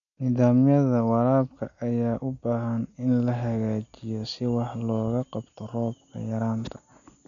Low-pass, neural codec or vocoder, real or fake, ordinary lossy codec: 7.2 kHz; none; real; none